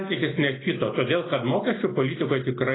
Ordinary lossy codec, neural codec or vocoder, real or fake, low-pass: AAC, 16 kbps; none; real; 7.2 kHz